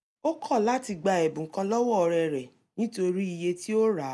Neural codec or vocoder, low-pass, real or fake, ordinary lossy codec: none; none; real; none